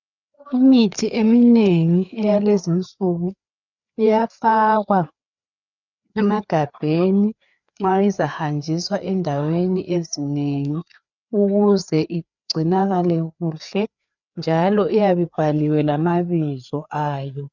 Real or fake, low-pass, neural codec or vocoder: fake; 7.2 kHz; codec, 16 kHz, 4 kbps, FreqCodec, larger model